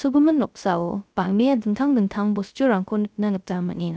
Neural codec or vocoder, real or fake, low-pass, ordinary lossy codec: codec, 16 kHz, 0.3 kbps, FocalCodec; fake; none; none